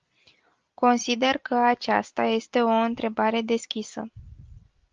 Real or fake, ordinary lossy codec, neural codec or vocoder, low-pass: real; Opus, 32 kbps; none; 7.2 kHz